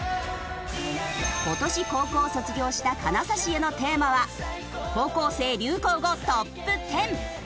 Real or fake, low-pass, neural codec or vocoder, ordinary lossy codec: real; none; none; none